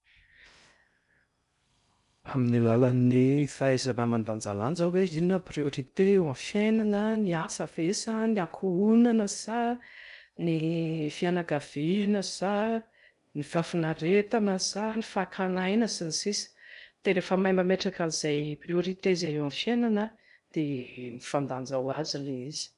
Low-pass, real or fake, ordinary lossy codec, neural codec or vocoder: 10.8 kHz; fake; none; codec, 16 kHz in and 24 kHz out, 0.6 kbps, FocalCodec, streaming, 2048 codes